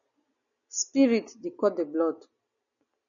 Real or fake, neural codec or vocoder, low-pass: real; none; 7.2 kHz